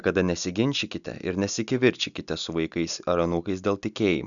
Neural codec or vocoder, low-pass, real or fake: none; 7.2 kHz; real